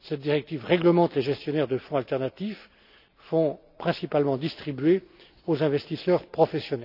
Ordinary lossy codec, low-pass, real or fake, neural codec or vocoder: none; 5.4 kHz; real; none